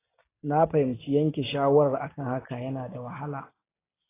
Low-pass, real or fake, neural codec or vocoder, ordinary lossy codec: 3.6 kHz; real; none; AAC, 16 kbps